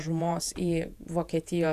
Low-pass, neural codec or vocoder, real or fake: 14.4 kHz; vocoder, 48 kHz, 128 mel bands, Vocos; fake